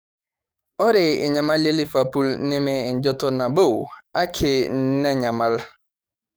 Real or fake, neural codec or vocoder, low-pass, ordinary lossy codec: fake; codec, 44.1 kHz, 7.8 kbps, DAC; none; none